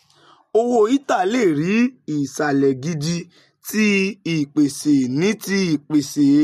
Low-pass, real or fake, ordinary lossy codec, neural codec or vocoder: 14.4 kHz; real; MP3, 64 kbps; none